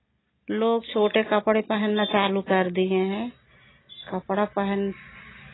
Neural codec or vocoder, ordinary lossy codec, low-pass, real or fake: none; AAC, 16 kbps; 7.2 kHz; real